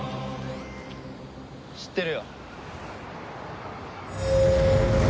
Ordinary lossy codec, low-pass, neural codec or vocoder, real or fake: none; none; none; real